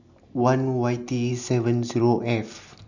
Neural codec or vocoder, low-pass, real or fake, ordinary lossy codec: none; 7.2 kHz; real; none